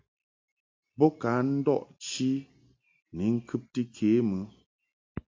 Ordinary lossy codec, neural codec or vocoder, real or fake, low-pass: MP3, 64 kbps; none; real; 7.2 kHz